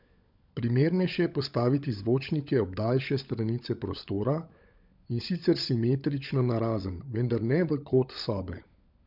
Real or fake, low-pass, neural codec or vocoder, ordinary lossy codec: fake; 5.4 kHz; codec, 16 kHz, 16 kbps, FunCodec, trained on LibriTTS, 50 frames a second; none